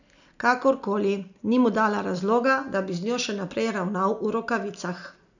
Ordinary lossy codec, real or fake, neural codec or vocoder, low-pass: none; real; none; 7.2 kHz